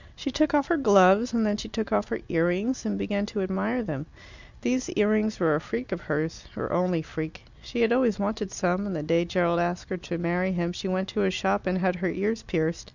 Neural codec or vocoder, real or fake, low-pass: none; real; 7.2 kHz